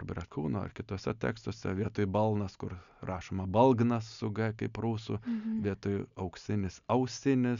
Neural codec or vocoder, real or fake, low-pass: none; real; 7.2 kHz